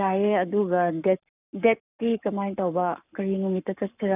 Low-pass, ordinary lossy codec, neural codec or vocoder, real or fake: 3.6 kHz; none; codec, 44.1 kHz, 7.8 kbps, Pupu-Codec; fake